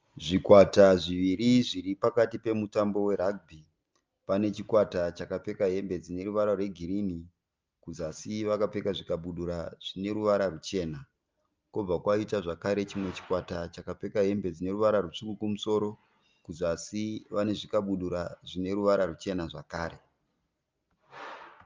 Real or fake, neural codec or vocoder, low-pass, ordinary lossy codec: real; none; 7.2 kHz; Opus, 24 kbps